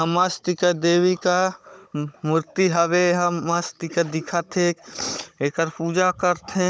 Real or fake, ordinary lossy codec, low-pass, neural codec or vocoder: fake; none; none; codec, 16 kHz, 16 kbps, FunCodec, trained on Chinese and English, 50 frames a second